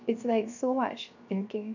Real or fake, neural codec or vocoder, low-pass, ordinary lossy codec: fake; codec, 16 kHz, 0.7 kbps, FocalCodec; 7.2 kHz; none